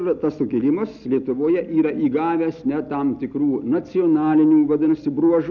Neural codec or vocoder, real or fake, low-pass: none; real; 7.2 kHz